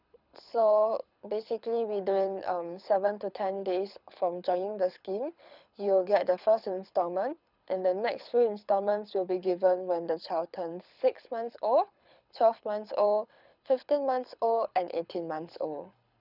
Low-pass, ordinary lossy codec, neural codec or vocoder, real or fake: 5.4 kHz; none; codec, 24 kHz, 6 kbps, HILCodec; fake